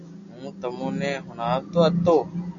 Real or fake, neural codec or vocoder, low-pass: real; none; 7.2 kHz